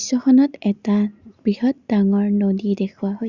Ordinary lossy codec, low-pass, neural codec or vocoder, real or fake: Opus, 64 kbps; 7.2 kHz; none; real